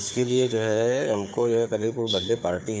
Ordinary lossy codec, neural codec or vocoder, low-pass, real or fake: none; codec, 16 kHz, 4 kbps, FunCodec, trained on Chinese and English, 50 frames a second; none; fake